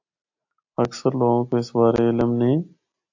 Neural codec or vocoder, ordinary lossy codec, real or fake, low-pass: none; AAC, 48 kbps; real; 7.2 kHz